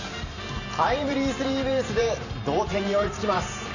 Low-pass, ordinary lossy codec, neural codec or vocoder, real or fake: 7.2 kHz; none; vocoder, 44.1 kHz, 128 mel bands every 512 samples, BigVGAN v2; fake